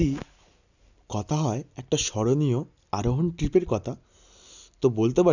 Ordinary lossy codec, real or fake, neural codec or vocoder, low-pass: none; real; none; 7.2 kHz